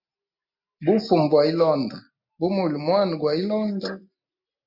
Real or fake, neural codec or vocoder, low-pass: real; none; 5.4 kHz